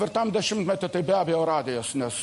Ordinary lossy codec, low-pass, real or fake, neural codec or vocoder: MP3, 48 kbps; 14.4 kHz; real; none